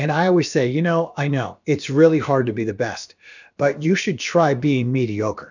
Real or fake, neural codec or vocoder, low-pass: fake; codec, 16 kHz, about 1 kbps, DyCAST, with the encoder's durations; 7.2 kHz